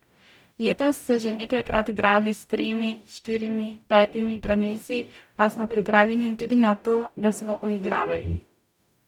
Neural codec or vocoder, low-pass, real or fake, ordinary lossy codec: codec, 44.1 kHz, 0.9 kbps, DAC; 19.8 kHz; fake; none